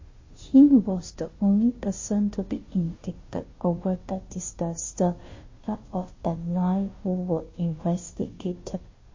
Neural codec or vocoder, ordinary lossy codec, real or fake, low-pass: codec, 16 kHz, 0.5 kbps, FunCodec, trained on Chinese and English, 25 frames a second; MP3, 32 kbps; fake; 7.2 kHz